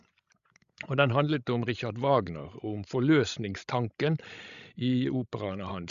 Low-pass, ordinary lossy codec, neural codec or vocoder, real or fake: 7.2 kHz; Opus, 64 kbps; codec, 16 kHz, 16 kbps, FreqCodec, larger model; fake